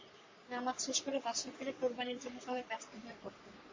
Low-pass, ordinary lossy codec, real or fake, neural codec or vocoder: 7.2 kHz; MP3, 32 kbps; fake; codec, 44.1 kHz, 3.4 kbps, Pupu-Codec